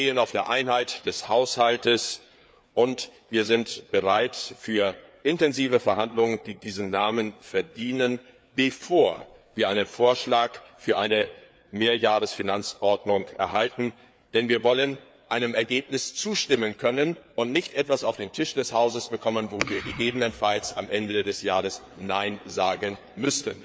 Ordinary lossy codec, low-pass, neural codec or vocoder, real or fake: none; none; codec, 16 kHz, 4 kbps, FreqCodec, larger model; fake